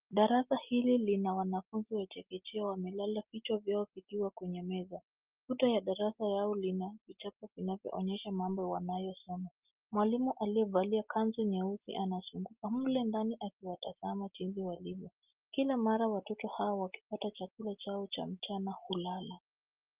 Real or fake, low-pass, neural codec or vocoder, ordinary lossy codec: real; 3.6 kHz; none; Opus, 32 kbps